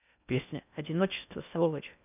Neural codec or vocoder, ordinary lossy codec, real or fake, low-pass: codec, 16 kHz in and 24 kHz out, 0.6 kbps, FocalCodec, streaming, 4096 codes; none; fake; 3.6 kHz